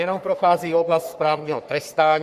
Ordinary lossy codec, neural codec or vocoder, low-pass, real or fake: Opus, 64 kbps; codec, 44.1 kHz, 3.4 kbps, Pupu-Codec; 14.4 kHz; fake